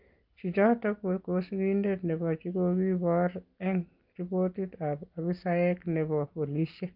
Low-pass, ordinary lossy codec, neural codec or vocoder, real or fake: 5.4 kHz; Opus, 24 kbps; none; real